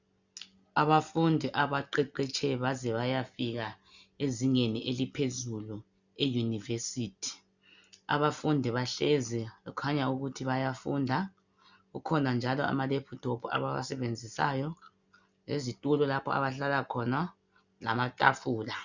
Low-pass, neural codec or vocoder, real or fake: 7.2 kHz; none; real